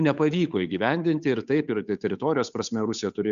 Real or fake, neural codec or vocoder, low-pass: fake; codec, 16 kHz, 8 kbps, FunCodec, trained on Chinese and English, 25 frames a second; 7.2 kHz